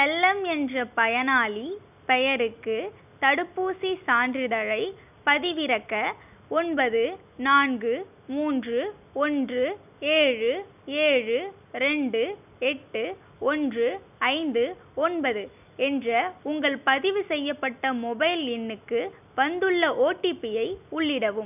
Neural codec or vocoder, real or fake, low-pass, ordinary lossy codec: none; real; 3.6 kHz; none